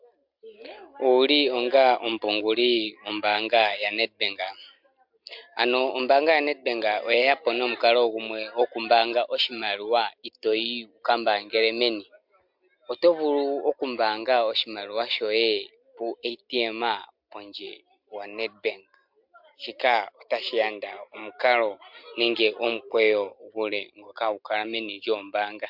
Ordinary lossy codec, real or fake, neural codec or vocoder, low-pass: MP3, 48 kbps; real; none; 5.4 kHz